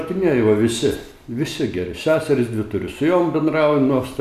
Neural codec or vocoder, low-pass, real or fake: none; 14.4 kHz; real